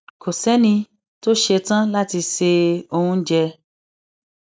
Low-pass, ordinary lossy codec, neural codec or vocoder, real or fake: none; none; none; real